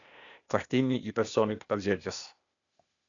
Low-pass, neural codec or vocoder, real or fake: 7.2 kHz; codec, 16 kHz, 0.8 kbps, ZipCodec; fake